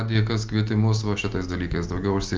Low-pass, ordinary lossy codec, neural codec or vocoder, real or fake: 7.2 kHz; Opus, 24 kbps; none; real